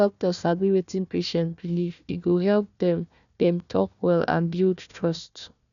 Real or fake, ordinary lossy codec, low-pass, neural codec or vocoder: fake; none; 7.2 kHz; codec, 16 kHz, 1 kbps, FunCodec, trained on Chinese and English, 50 frames a second